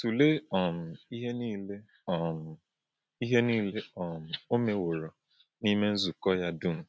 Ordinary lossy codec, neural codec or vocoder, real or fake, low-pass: none; none; real; none